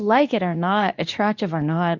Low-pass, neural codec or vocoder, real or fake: 7.2 kHz; codec, 24 kHz, 0.9 kbps, WavTokenizer, medium speech release version 2; fake